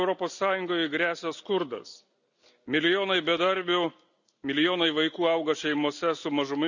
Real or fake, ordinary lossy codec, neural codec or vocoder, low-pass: real; none; none; 7.2 kHz